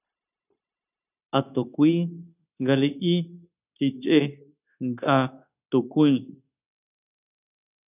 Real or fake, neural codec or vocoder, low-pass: fake; codec, 16 kHz, 0.9 kbps, LongCat-Audio-Codec; 3.6 kHz